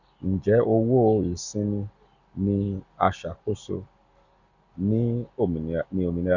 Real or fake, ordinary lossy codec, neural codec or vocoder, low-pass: real; Opus, 64 kbps; none; 7.2 kHz